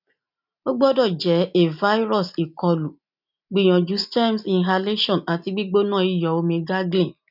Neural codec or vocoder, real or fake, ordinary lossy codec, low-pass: none; real; none; 5.4 kHz